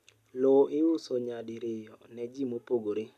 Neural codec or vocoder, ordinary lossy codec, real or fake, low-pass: none; AAC, 96 kbps; real; 14.4 kHz